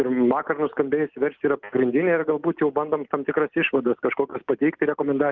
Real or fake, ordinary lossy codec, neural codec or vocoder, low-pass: real; Opus, 24 kbps; none; 7.2 kHz